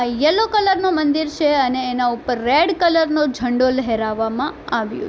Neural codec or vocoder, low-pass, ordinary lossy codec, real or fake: none; none; none; real